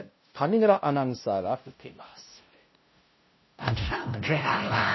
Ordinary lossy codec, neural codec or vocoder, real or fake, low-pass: MP3, 24 kbps; codec, 16 kHz, 0.5 kbps, FunCodec, trained on LibriTTS, 25 frames a second; fake; 7.2 kHz